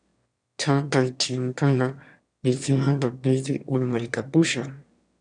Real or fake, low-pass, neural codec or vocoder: fake; 9.9 kHz; autoencoder, 22.05 kHz, a latent of 192 numbers a frame, VITS, trained on one speaker